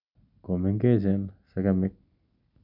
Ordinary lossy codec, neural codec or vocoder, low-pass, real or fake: none; none; 5.4 kHz; real